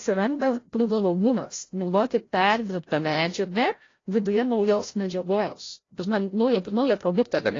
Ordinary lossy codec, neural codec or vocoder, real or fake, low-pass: AAC, 32 kbps; codec, 16 kHz, 0.5 kbps, FreqCodec, larger model; fake; 7.2 kHz